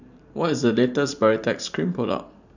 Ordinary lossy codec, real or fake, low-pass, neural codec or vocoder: none; fake; 7.2 kHz; vocoder, 44.1 kHz, 128 mel bands every 512 samples, BigVGAN v2